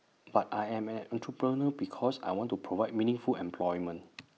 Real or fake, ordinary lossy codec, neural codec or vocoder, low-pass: real; none; none; none